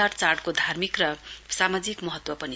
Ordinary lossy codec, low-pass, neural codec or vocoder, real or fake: none; none; none; real